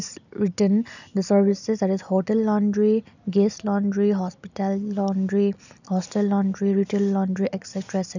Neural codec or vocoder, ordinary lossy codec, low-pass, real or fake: none; none; 7.2 kHz; real